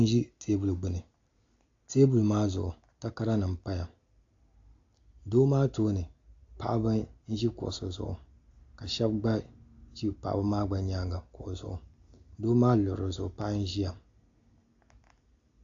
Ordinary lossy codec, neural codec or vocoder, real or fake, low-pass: AAC, 48 kbps; none; real; 7.2 kHz